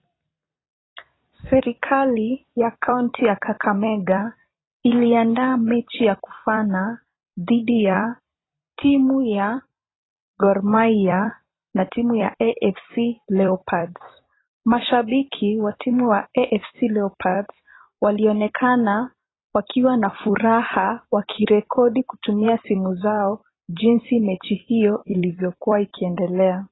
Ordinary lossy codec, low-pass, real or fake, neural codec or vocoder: AAC, 16 kbps; 7.2 kHz; fake; vocoder, 44.1 kHz, 128 mel bands every 256 samples, BigVGAN v2